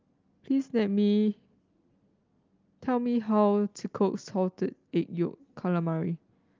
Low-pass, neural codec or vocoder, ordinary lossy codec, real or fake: 7.2 kHz; none; Opus, 32 kbps; real